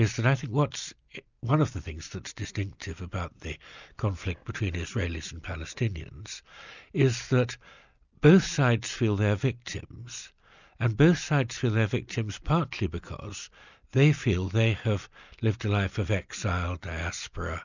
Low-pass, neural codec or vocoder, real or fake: 7.2 kHz; none; real